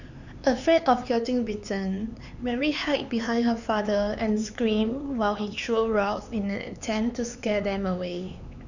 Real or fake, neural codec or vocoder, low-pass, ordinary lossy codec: fake; codec, 16 kHz, 4 kbps, X-Codec, HuBERT features, trained on LibriSpeech; 7.2 kHz; none